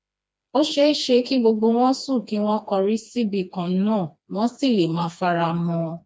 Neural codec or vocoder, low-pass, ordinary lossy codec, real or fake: codec, 16 kHz, 2 kbps, FreqCodec, smaller model; none; none; fake